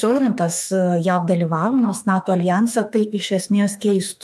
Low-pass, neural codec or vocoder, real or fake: 14.4 kHz; autoencoder, 48 kHz, 32 numbers a frame, DAC-VAE, trained on Japanese speech; fake